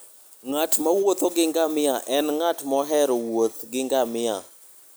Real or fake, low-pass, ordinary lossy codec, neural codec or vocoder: real; none; none; none